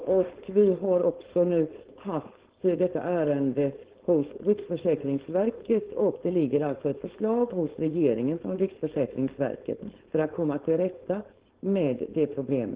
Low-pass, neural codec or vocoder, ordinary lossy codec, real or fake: 3.6 kHz; codec, 16 kHz, 4.8 kbps, FACodec; Opus, 16 kbps; fake